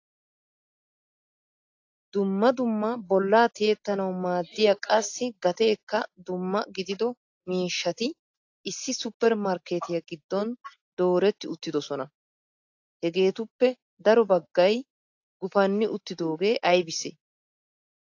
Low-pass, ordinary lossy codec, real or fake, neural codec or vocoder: 7.2 kHz; AAC, 48 kbps; real; none